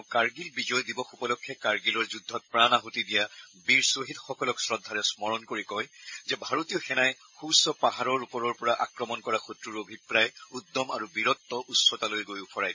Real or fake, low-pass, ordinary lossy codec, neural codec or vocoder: real; 7.2 kHz; MP3, 32 kbps; none